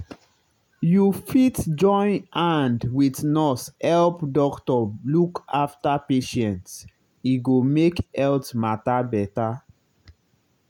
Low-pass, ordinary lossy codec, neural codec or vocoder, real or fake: 19.8 kHz; none; none; real